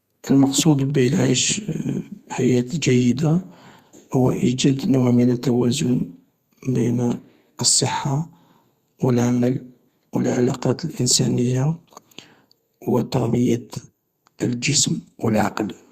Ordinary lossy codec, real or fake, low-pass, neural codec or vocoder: Opus, 64 kbps; fake; 14.4 kHz; codec, 32 kHz, 1.9 kbps, SNAC